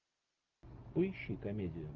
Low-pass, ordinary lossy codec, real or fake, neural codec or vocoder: 7.2 kHz; Opus, 24 kbps; real; none